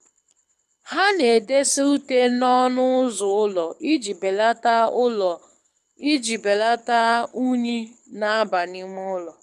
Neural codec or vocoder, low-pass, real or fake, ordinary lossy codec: codec, 24 kHz, 6 kbps, HILCodec; none; fake; none